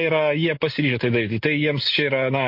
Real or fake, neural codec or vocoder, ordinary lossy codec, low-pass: real; none; MP3, 32 kbps; 5.4 kHz